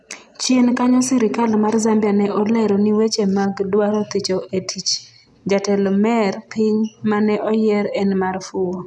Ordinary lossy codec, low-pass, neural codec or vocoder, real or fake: none; none; none; real